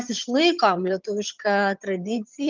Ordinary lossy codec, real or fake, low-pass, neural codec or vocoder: Opus, 24 kbps; fake; 7.2 kHz; vocoder, 22.05 kHz, 80 mel bands, HiFi-GAN